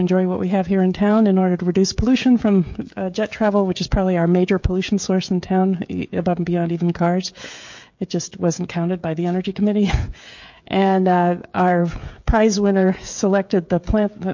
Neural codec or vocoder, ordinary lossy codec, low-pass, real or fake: codec, 16 kHz, 16 kbps, FreqCodec, smaller model; MP3, 48 kbps; 7.2 kHz; fake